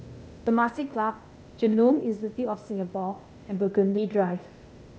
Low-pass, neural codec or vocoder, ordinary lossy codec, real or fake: none; codec, 16 kHz, 0.8 kbps, ZipCodec; none; fake